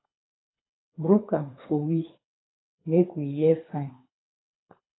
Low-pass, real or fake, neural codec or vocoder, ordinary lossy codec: 7.2 kHz; fake; codec, 24 kHz, 3 kbps, HILCodec; AAC, 16 kbps